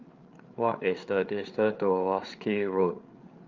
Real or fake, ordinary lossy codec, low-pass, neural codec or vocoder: fake; Opus, 24 kbps; 7.2 kHz; codec, 16 kHz, 8 kbps, FreqCodec, larger model